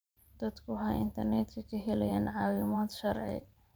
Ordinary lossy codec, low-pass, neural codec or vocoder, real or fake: none; none; vocoder, 44.1 kHz, 128 mel bands every 512 samples, BigVGAN v2; fake